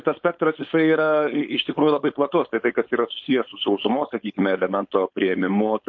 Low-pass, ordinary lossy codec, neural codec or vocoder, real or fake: 7.2 kHz; MP3, 48 kbps; codec, 16 kHz, 4.8 kbps, FACodec; fake